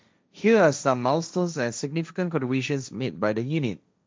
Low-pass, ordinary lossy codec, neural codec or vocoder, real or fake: none; none; codec, 16 kHz, 1.1 kbps, Voila-Tokenizer; fake